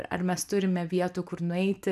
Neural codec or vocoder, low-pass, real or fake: none; 14.4 kHz; real